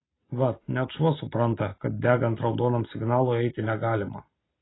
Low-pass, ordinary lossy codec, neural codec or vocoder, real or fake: 7.2 kHz; AAC, 16 kbps; none; real